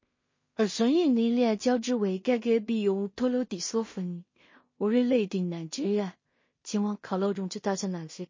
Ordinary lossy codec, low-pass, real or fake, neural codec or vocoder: MP3, 32 kbps; 7.2 kHz; fake; codec, 16 kHz in and 24 kHz out, 0.4 kbps, LongCat-Audio-Codec, two codebook decoder